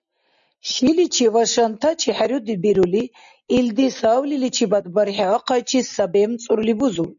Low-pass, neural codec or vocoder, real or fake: 7.2 kHz; none; real